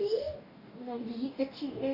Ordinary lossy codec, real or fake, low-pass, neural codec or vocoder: none; fake; 5.4 kHz; codec, 44.1 kHz, 2.6 kbps, DAC